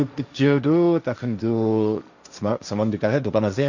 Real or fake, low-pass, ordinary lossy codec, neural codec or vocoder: fake; 7.2 kHz; none; codec, 16 kHz, 1.1 kbps, Voila-Tokenizer